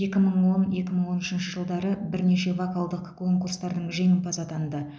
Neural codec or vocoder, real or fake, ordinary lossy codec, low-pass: none; real; none; none